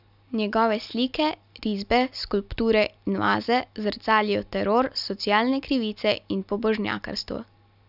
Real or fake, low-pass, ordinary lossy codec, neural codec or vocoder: real; 5.4 kHz; none; none